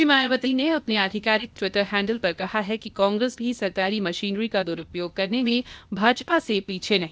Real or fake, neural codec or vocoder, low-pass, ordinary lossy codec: fake; codec, 16 kHz, 0.8 kbps, ZipCodec; none; none